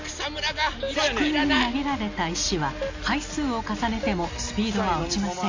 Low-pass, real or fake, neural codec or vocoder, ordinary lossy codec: 7.2 kHz; real; none; AAC, 48 kbps